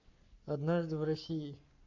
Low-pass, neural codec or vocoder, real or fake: 7.2 kHz; codec, 44.1 kHz, 7.8 kbps, Pupu-Codec; fake